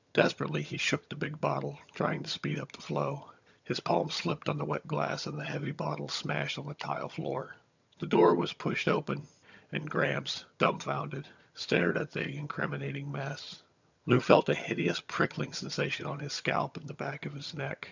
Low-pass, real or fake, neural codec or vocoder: 7.2 kHz; fake; vocoder, 22.05 kHz, 80 mel bands, HiFi-GAN